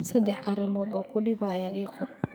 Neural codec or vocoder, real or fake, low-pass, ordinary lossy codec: codec, 44.1 kHz, 2.6 kbps, SNAC; fake; none; none